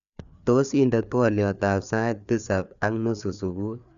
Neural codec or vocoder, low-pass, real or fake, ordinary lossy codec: codec, 16 kHz, 4 kbps, FreqCodec, larger model; 7.2 kHz; fake; none